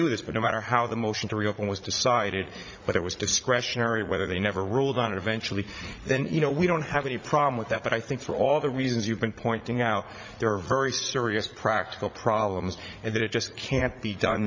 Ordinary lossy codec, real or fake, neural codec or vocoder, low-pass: MP3, 64 kbps; fake; vocoder, 44.1 kHz, 80 mel bands, Vocos; 7.2 kHz